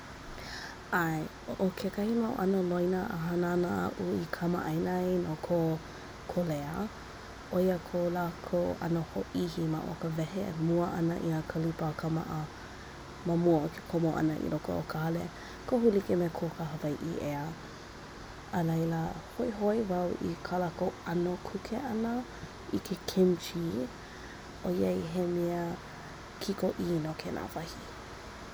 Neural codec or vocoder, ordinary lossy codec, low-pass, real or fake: none; none; none; real